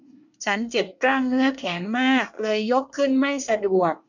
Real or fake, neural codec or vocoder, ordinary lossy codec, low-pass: fake; codec, 24 kHz, 1 kbps, SNAC; none; 7.2 kHz